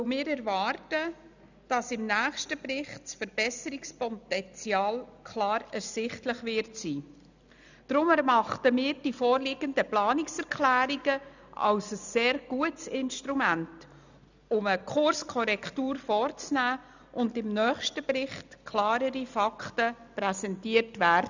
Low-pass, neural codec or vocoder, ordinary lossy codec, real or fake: 7.2 kHz; none; none; real